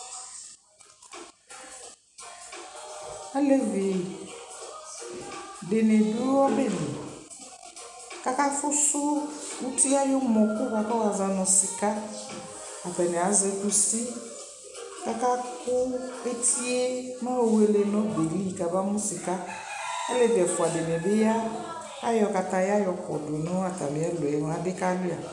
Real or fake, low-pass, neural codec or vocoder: real; 10.8 kHz; none